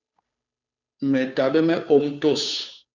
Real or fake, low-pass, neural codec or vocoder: fake; 7.2 kHz; codec, 16 kHz, 2 kbps, FunCodec, trained on Chinese and English, 25 frames a second